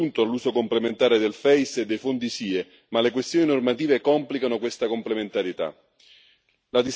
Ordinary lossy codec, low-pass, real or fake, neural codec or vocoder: none; none; real; none